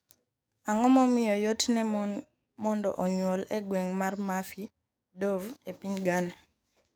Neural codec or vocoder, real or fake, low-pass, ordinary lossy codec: codec, 44.1 kHz, 7.8 kbps, DAC; fake; none; none